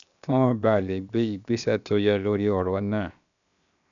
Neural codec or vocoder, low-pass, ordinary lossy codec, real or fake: codec, 16 kHz, 0.7 kbps, FocalCodec; 7.2 kHz; none; fake